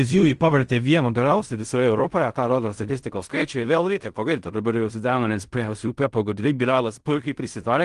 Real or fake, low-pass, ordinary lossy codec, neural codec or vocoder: fake; 10.8 kHz; Opus, 24 kbps; codec, 16 kHz in and 24 kHz out, 0.4 kbps, LongCat-Audio-Codec, fine tuned four codebook decoder